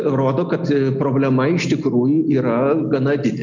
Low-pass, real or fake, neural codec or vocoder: 7.2 kHz; real; none